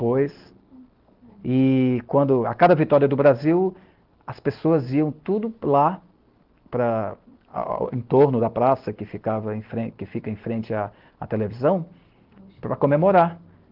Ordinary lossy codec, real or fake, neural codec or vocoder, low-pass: Opus, 16 kbps; real; none; 5.4 kHz